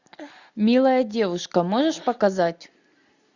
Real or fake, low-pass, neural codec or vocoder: real; 7.2 kHz; none